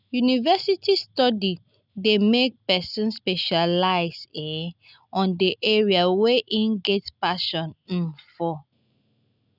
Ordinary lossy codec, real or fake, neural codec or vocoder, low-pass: none; real; none; 5.4 kHz